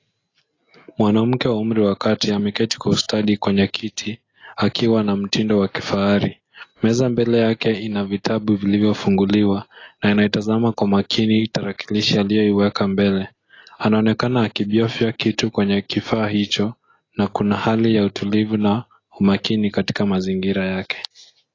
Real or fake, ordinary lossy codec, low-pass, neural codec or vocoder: real; AAC, 32 kbps; 7.2 kHz; none